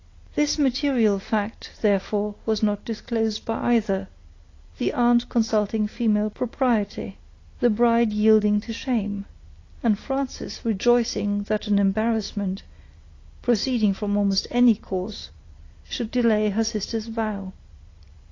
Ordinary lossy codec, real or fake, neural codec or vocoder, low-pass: AAC, 32 kbps; real; none; 7.2 kHz